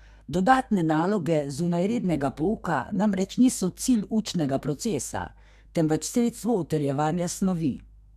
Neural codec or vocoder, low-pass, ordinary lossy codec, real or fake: codec, 32 kHz, 1.9 kbps, SNAC; 14.4 kHz; none; fake